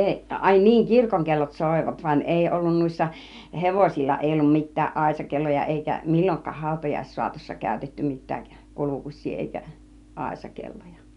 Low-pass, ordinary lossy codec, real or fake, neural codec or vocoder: 10.8 kHz; none; real; none